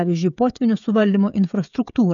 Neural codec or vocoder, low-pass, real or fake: codec, 16 kHz, 16 kbps, FreqCodec, smaller model; 7.2 kHz; fake